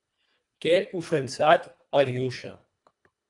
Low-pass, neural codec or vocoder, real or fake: 10.8 kHz; codec, 24 kHz, 1.5 kbps, HILCodec; fake